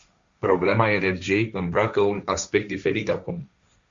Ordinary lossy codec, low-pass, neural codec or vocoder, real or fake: Opus, 64 kbps; 7.2 kHz; codec, 16 kHz, 1.1 kbps, Voila-Tokenizer; fake